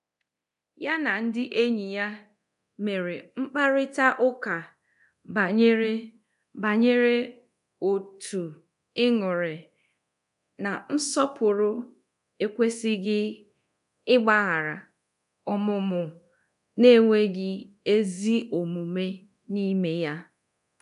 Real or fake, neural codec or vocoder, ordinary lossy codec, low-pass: fake; codec, 24 kHz, 0.9 kbps, DualCodec; none; 10.8 kHz